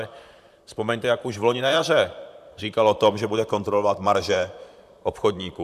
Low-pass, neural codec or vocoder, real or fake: 14.4 kHz; vocoder, 44.1 kHz, 128 mel bands, Pupu-Vocoder; fake